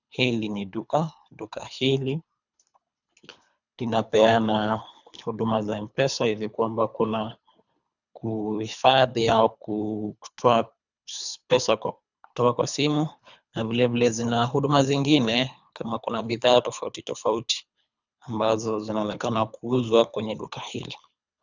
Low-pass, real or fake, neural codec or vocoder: 7.2 kHz; fake; codec, 24 kHz, 3 kbps, HILCodec